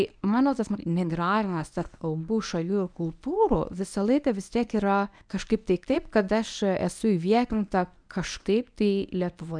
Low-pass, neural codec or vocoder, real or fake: 9.9 kHz; codec, 24 kHz, 0.9 kbps, WavTokenizer, medium speech release version 1; fake